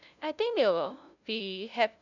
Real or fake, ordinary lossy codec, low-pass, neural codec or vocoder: fake; none; 7.2 kHz; codec, 16 kHz, 0.5 kbps, FunCodec, trained on LibriTTS, 25 frames a second